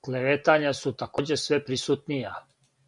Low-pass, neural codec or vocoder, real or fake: 10.8 kHz; none; real